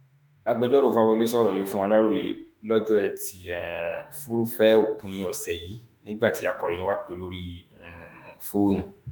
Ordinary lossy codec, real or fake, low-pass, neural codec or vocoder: none; fake; none; autoencoder, 48 kHz, 32 numbers a frame, DAC-VAE, trained on Japanese speech